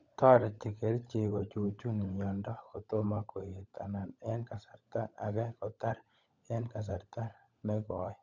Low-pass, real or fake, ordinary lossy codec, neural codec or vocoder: 7.2 kHz; fake; Opus, 64 kbps; codec, 16 kHz, 16 kbps, FunCodec, trained on LibriTTS, 50 frames a second